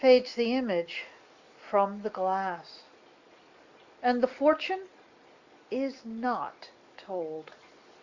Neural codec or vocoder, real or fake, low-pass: none; real; 7.2 kHz